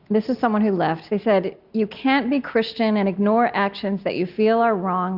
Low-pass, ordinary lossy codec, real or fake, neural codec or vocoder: 5.4 kHz; Opus, 64 kbps; real; none